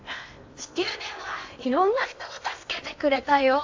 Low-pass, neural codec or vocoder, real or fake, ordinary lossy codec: 7.2 kHz; codec, 16 kHz in and 24 kHz out, 0.8 kbps, FocalCodec, streaming, 65536 codes; fake; AAC, 48 kbps